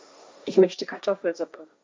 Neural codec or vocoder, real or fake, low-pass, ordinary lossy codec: codec, 16 kHz, 1.1 kbps, Voila-Tokenizer; fake; none; none